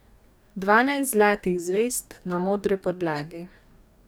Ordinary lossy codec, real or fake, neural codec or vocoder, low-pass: none; fake; codec, 44.1 kHz, 2.6 kbps, DAC; none